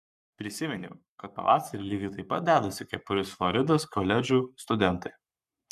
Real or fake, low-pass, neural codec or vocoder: fake; 14.4 kHz; codec, 44.1 kHz, 7.8 kbps, Pupu-Codec